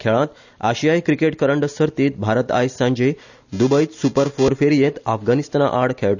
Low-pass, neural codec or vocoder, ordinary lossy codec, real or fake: 7.2 kHz; none; none; real